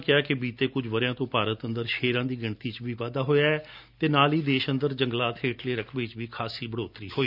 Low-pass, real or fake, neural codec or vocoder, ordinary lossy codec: 5.4 kHz; real; none; none